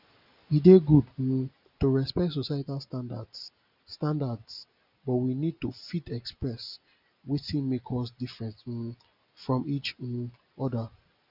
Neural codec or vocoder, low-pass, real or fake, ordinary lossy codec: none; 5.4 kHz; real; none